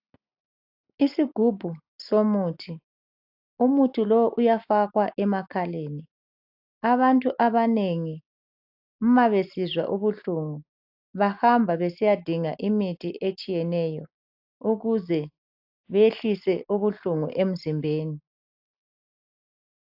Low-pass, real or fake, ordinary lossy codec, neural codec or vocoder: 5.4 kHz; real; AAC, 48 kbps; none